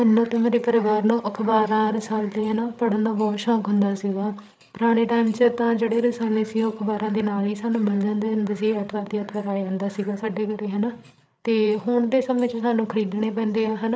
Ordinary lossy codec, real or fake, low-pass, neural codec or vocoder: none; fake; none; codec, 16 kHz, 8 kbps, FreqCodec, larger model